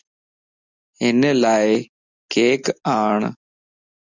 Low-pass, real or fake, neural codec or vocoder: 7.2 kHz; real; none